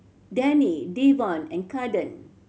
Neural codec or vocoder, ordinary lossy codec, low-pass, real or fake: none; none; none; real